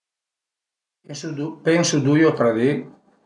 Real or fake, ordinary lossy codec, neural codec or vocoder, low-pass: real; none; none; 10.8 kHz